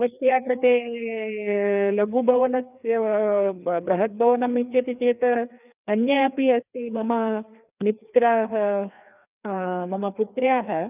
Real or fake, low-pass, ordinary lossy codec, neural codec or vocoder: fake; 3.6 kHz; none; codec, 16 kHz, 2 kbps, FreqCodec, larger model